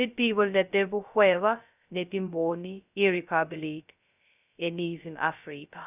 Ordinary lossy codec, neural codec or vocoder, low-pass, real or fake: none; codec, 16 kHz, 0.2 kbps, FocalCodec; 3.6 kHz; fake